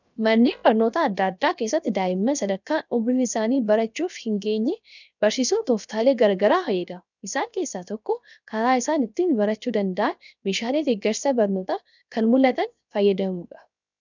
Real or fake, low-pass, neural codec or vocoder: fake; 7.2 kHz; codec, 16 kHz, 0.7 kbps, FocalCodec